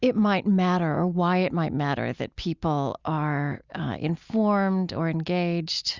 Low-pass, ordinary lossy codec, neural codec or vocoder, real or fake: 7.2 kHz; Opus, 64 kbps; none; real